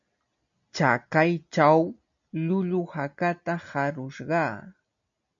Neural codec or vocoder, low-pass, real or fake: none; 7.2 kHz; real